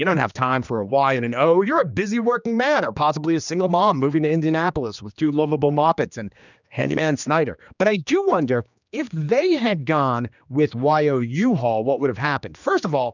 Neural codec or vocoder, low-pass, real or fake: codec, 16 kHz, 2 kbps, X-Codec, HuBERT features, trained on general audio; 7.2 kHz; fake